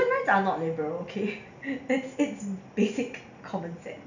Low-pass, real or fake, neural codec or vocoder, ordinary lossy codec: 7.2 kHz; real; none; none